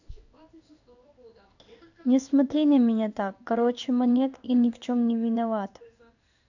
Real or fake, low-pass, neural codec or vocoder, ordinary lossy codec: fake; 7.2 kHz; codec, 16 kHz in and 24 kHz out, 1 kbps, XY-Tokenizer; none